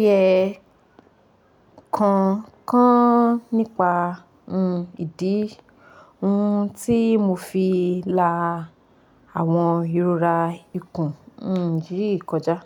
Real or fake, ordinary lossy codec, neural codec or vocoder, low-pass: real; none; none; 19.8 kHz